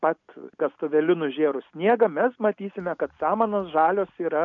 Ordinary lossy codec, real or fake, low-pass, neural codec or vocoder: MP3, 64 kbps; real; 7.2 kHz; none